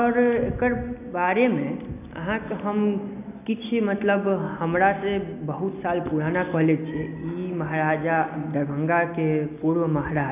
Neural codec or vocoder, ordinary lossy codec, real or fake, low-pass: none; none; real; 3.6 kHz